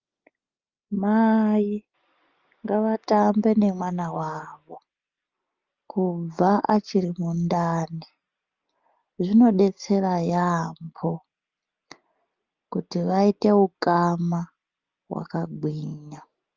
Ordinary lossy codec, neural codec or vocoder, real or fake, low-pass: Opus, 24 kbps; none; real; 7.2 kHz